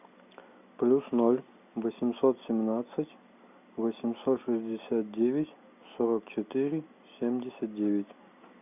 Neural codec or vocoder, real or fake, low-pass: none; real; 3.6 kHz